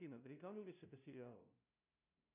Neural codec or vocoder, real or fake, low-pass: codec, 16 kHz, 0.5 kbps, FunCodec, trained on LibriTTS, 25 frames a second; fake; 3.6 kHz